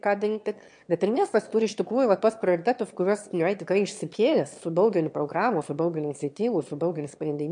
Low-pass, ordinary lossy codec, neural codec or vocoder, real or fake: 9.9 kHz; MP3, 64 kbps; autoencoder, 22.05 kHz, a latent of 192 numbers a frame, VITS, trained on one speaker; fake